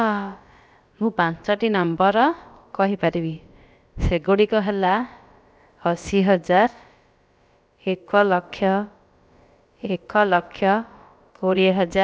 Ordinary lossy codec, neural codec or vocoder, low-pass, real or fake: none; codec, 16 kHz, about 1 kbps, DyCAST, with the encoder's durations; none; fake